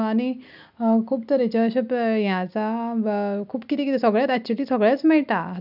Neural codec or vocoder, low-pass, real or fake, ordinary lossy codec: none; 5.4 kHz; real; none